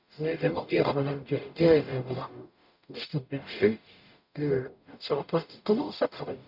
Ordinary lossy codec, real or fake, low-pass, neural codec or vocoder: none; fake; 5.4 kHz; codec, 44.1 kHz, 0.9 kbps, DAC